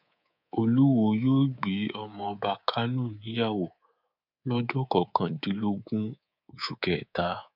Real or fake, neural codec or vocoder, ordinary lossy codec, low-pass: fake; codec, 24 kHz, 3.1 kbps, DualCodec; none; 5.4 kHz